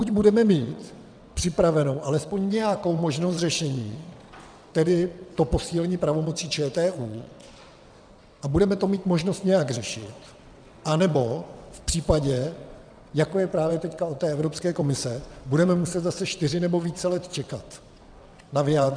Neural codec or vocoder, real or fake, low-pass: vocoder, 22.05 kHz, 80 mel bands, WaveNeXt; fake; 9.9 kHz